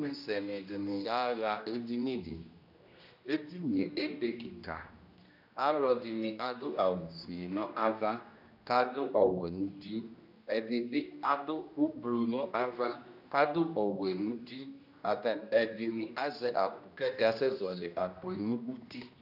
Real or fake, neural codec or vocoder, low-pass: fake; codec, 16 kHz, 1 kbps, X-Codec, HuBERT features, trained on general audio; 5.4 kHz